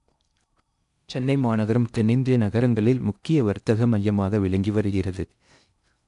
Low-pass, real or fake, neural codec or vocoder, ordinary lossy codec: 10.8 kHz; fake; codec, 16 kHz in and 24 kHz out, 0.6 kbps, FocalCodec, streaming, 4096 codes; none